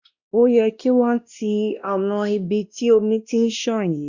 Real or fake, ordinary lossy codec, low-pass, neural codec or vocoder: fake; Opus, 64 kbps; 7.2 kHz; codec, 16 kHz, 1 kbps, X-Codec, WavLM features, trained on Multilingual LibriSpeech